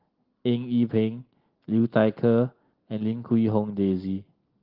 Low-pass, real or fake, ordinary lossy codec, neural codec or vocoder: 5.4 kHz; real; Opus, 16 kbps; none